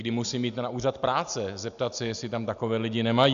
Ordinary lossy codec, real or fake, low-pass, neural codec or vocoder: AAC, 96 kbps; real; 7.2 kHz; none